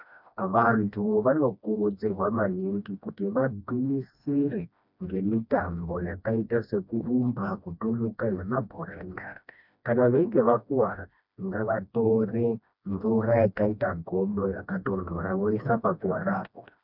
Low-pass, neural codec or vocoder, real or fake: 5.4 kHz; codec, 16 kHz, 1 kbps, FreqCodec, smaller model; fake